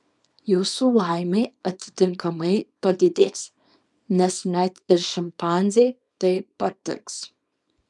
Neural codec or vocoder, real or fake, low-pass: codec, 24 kHz, 0.9 kbps, WavTokenizer, small release; fake; 10.8 kHz